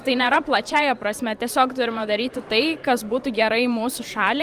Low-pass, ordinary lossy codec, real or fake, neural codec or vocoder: 14.4 kHz; Opus, 32 kbps; fake; vocoder, 44.1 kHz, 128 mel bands every 512 samples, BigVGAN v2